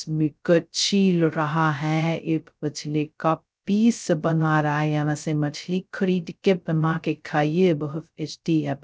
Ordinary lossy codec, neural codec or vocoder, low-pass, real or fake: none; codec, 16 kHz, 0.2 kbps, FocalCodec; none; fake